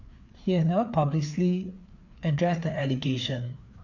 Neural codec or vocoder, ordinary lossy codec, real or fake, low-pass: codec, 16 kHz, 4 kbps, FreqCodec, larger model; none; fake; 7.2 kHz